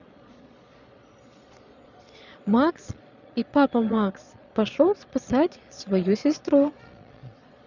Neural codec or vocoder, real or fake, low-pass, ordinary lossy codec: vocoder, 22.05 kHz, 80 mel bands, Vocos; fake; 7.2 kHz; none